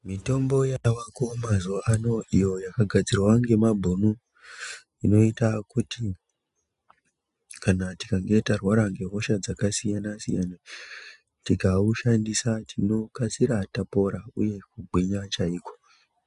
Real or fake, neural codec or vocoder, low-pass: real; none; 10.8 kHz